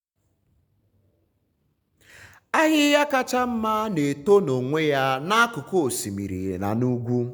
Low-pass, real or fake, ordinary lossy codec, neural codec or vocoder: none; real; none; none